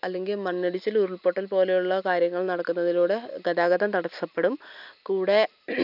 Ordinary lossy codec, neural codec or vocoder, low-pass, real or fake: none; none; 5.4 kHz; real